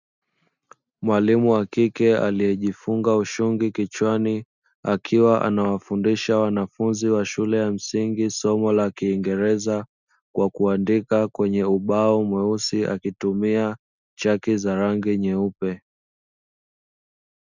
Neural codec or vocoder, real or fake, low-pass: none; real; 7.2 kHz